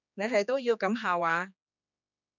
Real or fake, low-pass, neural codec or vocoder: fake; 7.2 kHz; codec, 16 kHz, 2 kbps, X-Codec, HuBERT features, trained on general audio